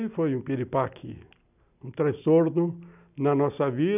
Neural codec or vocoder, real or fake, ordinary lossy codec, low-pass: none; real; none; 3.6 kHz